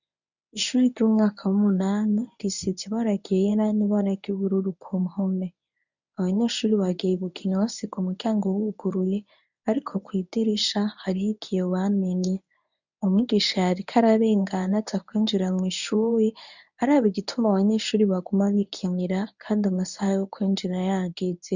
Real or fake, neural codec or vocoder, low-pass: fake; codec, 24 kHz, 0.9 kbps, WavTokenizer, medium speech release version 1; 7.2 kHz